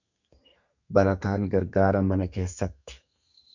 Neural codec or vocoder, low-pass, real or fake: codec, 32 kHz, 1.9 kbps, SNAC; 7.2 kHz; fake